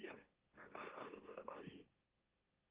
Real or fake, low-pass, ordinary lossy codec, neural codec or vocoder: fake; 3.6 kHz; Opus, 16 kbps; autoencoder, 44.1 kHz, a latent of 192 numbers a frame, MeloTTS